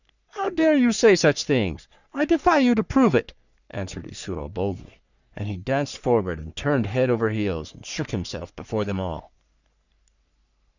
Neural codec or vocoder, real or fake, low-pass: codec, 44.1 kHz, 3.4 kbps, Pupu-Codec; fake; 7.2 kHz